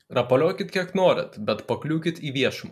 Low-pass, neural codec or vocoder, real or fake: 14.4 kHz; none; real